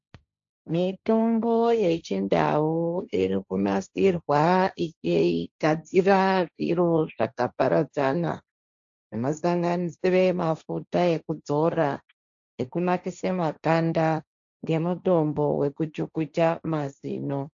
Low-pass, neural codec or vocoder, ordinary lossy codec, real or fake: 7.2 kHz; codec, 16 kHz, 1.1 kbps, Voila-Tokenizer; AAC, 64 kbps; fake